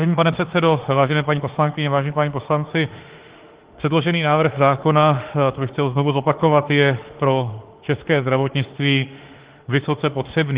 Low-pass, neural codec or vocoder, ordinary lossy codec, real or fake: 3.6 kHz; autoencoder, 48 kHz, 32 numbers a frame, DAC-VAE, trained on Japanese speech; Opus, 16 kbps; fake